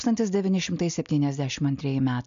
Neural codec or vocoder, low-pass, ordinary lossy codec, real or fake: none; 7.2 kHz; MP3, 64 kbps; real